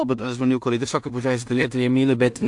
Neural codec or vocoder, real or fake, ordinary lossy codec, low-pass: codec, 16 kHz in and 24 kHz out, 0.4 kbps, LongCat-Audio-Codec, two codebook decoder; fake; AAC, 64 kbps; 10.8 kHz